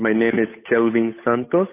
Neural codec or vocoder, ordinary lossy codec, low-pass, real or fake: codec, 16 kHz, 8 kbps, FunCodec, trained on Chinese and English, 25 frames a second; AAC, 32 kbps; 3.6 kHz; fake